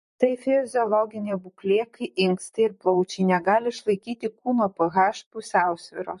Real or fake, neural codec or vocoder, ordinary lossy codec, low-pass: fake; vocoder, 44.1 kHz, 128 mel bands, Pupu-Vocoder; MP3, 48 kbps; 14.4 kHz